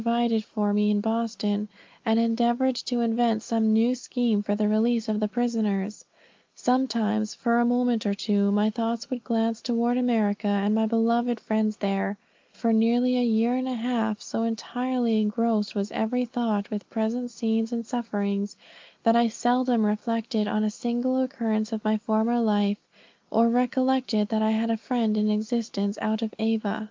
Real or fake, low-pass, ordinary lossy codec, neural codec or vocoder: real; 7.2 kHz; Opus, 24 kbps; none